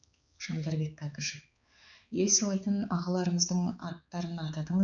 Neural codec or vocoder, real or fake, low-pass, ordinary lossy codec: codec, 16 kHz, 4 kbps, X-Codec, HuBERT features, trained on balanced general audio; fake; 7.2 kHz; none